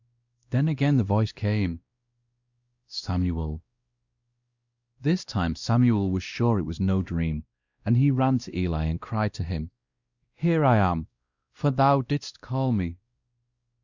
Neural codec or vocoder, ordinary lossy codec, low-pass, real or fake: codec, 16 kHz, 1 kbps, X-Codec, WavLM features, trained on Multilingual LibriSpeech; Opus, 64 kbps; 7.2 kHz; fake